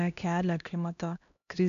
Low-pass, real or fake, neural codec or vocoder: 7.2 kHz; fake; codec, 16 kHz, 1 kbps, X-Codec, HuBERT features, trained on LibriSpeech